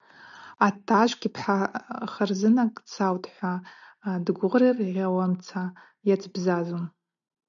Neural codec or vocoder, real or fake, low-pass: none; real; 7.2 kHz